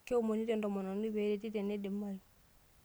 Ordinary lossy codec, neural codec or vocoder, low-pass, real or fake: none; none; none; real